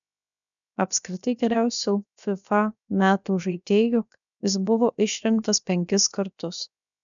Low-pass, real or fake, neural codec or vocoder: 7.2 kHz; fake; codec, 16 kHz, 0.7 kbps, FocalCodec